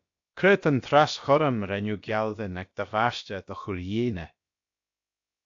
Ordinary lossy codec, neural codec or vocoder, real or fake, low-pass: AAC, 64 kbps; codec, 16 kHz, about 1 kbps, DyCAST, with the encoder's durations; fake; 7.2 kHz